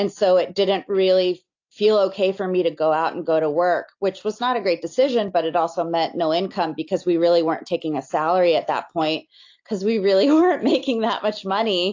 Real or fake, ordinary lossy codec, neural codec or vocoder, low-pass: real; AAC, 48 kbps; none; 7.2 kHz